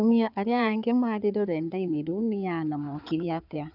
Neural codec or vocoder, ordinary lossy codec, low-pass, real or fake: codec, 16 kHz in and 24 kHz out, 2.2 kbps, FireRedTTS-2 codec; none; 5.4 kHz; fake